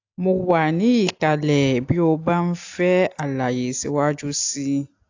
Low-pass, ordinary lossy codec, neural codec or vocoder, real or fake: 7.2 kHz; AAC, 48 kbps; none; real